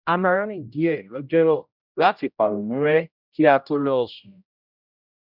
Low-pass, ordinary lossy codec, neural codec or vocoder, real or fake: 5.4 kHz; none; codec, 16 kHz, 0.5 kbps, X-Codec, HuBERT features, trained on general audio; fake